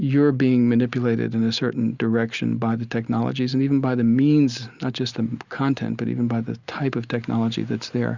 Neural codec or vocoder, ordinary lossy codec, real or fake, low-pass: none; Opus, 64 kbps; real; 7.2 kHz